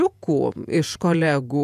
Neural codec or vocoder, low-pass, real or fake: vocoder, 48 kHz, 128 mel bands, Vocos; 14.4 kHz; fake